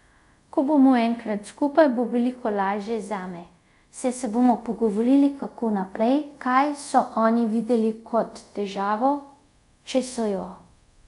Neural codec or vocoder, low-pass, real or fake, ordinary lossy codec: codec, 24 kHz, 0.5 kbps, DualCodec; 10.8 kHz; fake; none